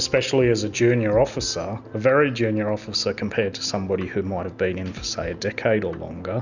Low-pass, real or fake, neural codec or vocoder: 7.2 kHz; real; none